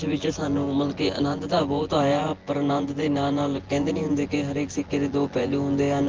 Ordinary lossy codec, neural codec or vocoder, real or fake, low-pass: Opus, 16 kbps; vocoder, 24 kHz, 100 mel bands, Vocos; fake; 7.2 kHz